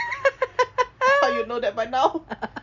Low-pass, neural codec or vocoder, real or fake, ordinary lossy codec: 7.2 kHz; none; real; none